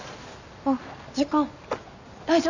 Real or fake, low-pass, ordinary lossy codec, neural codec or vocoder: real; 7.2 kHz; none; none